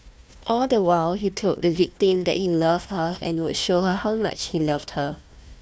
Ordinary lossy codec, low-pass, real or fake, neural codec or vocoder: none; none; fake; codec, 16 kHz, 1 kbps, FunCodec, trained on Chinese and English, 50 frames a second